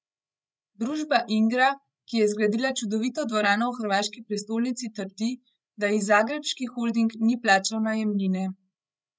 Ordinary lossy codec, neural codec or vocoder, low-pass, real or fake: none; codec, 16 kHz, 16 kbps, FreqCodec, larger model; none; fake